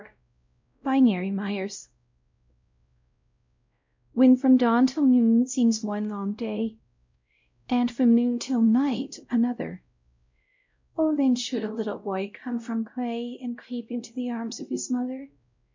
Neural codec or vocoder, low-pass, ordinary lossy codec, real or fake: codec, 16 kHz, 0.5 kbps, X-Codec, WavLM features, trained on Multilingual LibriSpeech; 7.2 kHz; MP3, 64 kbps; fake